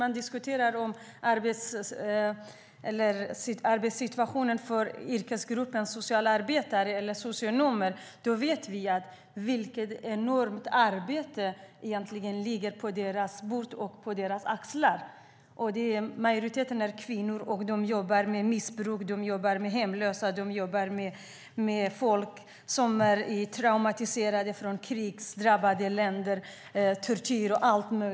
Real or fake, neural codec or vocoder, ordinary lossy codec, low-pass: real; none; none; none